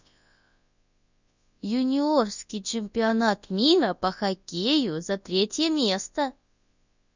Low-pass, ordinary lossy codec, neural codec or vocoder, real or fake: 7.2 kHz; Opus, 64 kbps; codec, 24 kHz, 0.9 kbps, WavTokenizer, large speech release; fake